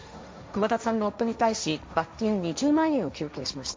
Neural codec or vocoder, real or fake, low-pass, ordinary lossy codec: codec, 16 kHz, 1.1 kbps, Voila-Tokenizer; fake; none; none